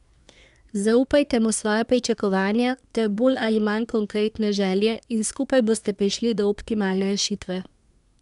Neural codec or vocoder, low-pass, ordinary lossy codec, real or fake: codec, 24 kHz, 1 kbps, SNAC; 10.8 kHz; none; fake